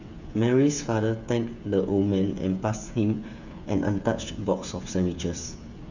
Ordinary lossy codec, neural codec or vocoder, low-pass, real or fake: none; codec, 16 kHz, 8 kbps, FreqCodec, smaller model; 7.2 kHz; fake